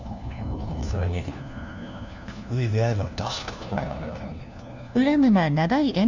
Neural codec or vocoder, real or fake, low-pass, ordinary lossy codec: codec, 16 kHz, 1 kbps, FunCodec, trained on LibriTTS, 50 frames a second; fake; 7.2 kHz; none